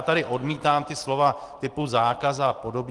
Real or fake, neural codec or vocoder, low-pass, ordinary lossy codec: real; none; 10.8 kHz; Opus, 24 kbps